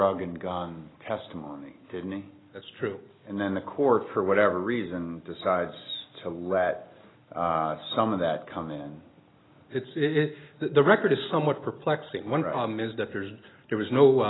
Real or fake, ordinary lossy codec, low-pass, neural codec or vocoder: real; AAC, 16 kbps; 7.2 kHz; none